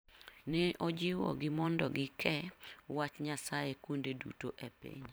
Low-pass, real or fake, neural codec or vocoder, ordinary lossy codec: none; fake; vocoder, 44.1 kHz, 128 mel bands every 512 samples, BigVGAN v2; none